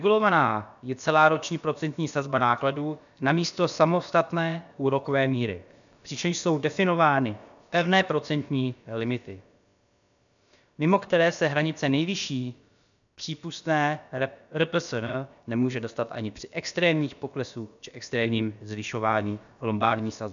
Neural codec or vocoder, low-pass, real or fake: codec, 16 kHz, about 1 kbps, DyCAST, with the encoder's durations; 7.2 kHz; fake